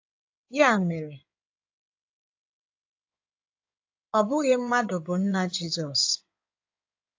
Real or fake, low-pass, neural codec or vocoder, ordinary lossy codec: fake; 7.2 kHz; codec, 16 kHz in and 24 kHz out, 2.2 kbps, FireRedTTS-2 codec; AAC, 48 kbps